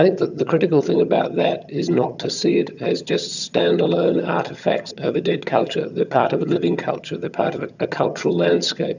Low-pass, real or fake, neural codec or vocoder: 7.2 kHz; fake; vocoder, 22.05 kHz, 80 mel bands, HiFi-GAN